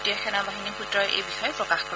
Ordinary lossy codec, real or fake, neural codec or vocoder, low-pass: none; real; none; none